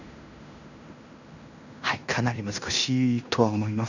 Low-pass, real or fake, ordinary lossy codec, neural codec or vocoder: 7.2 kHz; fake; none; codec, 16 kHz in and 24 kHz out, 0.9 kbps, LongCat-Audio-Codec, fine tuned four codebook decoder